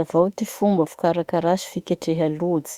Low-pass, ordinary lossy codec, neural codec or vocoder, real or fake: 19.8 kHz; Opus, 64 kbps; autoencoder, 48 kHz, 32 numbers a frame, DAC-VAE, trained on Japanese speech; fake